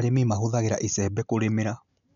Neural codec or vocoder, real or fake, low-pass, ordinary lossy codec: none; real; 7.2 kHz; none